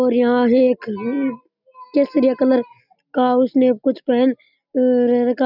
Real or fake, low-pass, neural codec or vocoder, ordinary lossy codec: real; 5.4 kHz; none; none